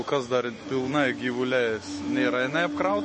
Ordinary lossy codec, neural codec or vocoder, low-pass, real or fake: MP3, 32 kbps; autoencoder, 48 kHz, 128 numbers a frame, DAC-VAE, trained on Japanese speech; 10.8 kHz; fake